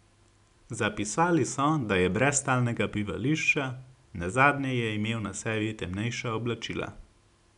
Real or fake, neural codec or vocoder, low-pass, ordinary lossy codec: real; none; 10.8 kHz; none